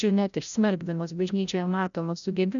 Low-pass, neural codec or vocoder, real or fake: 7.2 kHz; codec, 16 kHz, 0.5 kbps, FreqCodec, larger model; fake